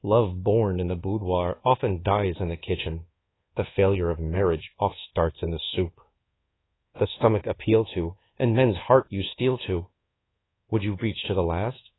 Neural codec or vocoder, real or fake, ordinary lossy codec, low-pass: codec, 24 kHz, 1.2 kbps, DualCodec; fake; AAC, 16 kbps; 7.2 kHz